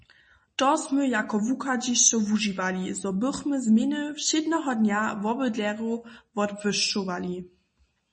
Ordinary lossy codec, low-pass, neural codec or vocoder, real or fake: MP3, 32 kbps; 10.8 kHz; none; real